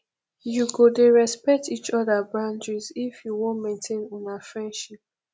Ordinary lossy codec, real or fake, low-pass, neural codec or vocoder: none; real; none; none